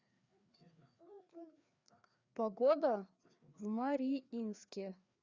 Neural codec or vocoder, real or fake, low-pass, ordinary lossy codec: codec, 16 kHz, 2 kbps, FreqCodec, larger model; fake; 7.2 kHz; Opus, 64 kbps